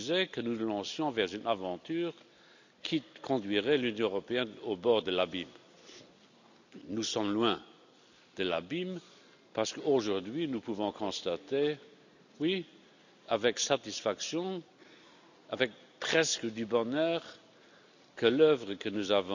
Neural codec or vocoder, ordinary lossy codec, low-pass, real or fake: none; none; 7.2 kHz; real